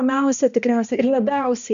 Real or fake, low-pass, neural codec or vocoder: fake; 7.2 kHz; codec, 16 kHz, 1 kbps, X-Codec, HuBERT features, trained on balanced general audio